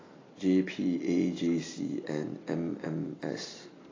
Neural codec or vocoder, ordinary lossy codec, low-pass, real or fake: none; AAC, 32 kbps; 7.2 kHz; real